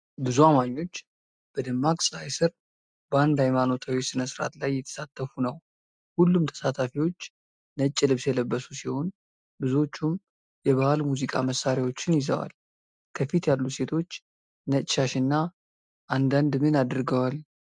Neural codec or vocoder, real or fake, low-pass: none; real; 9.9 kHz